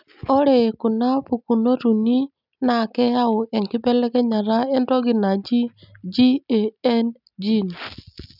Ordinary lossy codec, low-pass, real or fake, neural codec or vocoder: none; 5.4 kHz; real; none